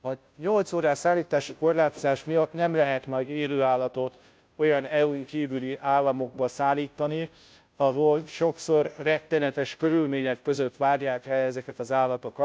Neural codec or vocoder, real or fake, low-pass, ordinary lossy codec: codec, 16 kHz, 0.5 kbps, FunCodec, trained on Chinese and English, 25 frames a second; fake; none; none